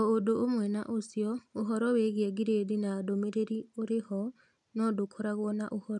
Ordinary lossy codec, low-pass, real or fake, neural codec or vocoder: none; 10.8 kHz; real; none